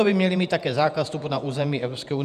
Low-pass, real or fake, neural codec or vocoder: 14.4 kHz; fake; vocoder, 48 kHz, 128 mel bands, Vocos